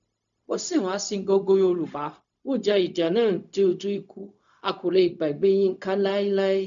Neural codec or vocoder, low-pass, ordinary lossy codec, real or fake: codec, 16 kHz, 0.4 kbps, LongCat-Audio-Codec; 7.2 kHz; none; fake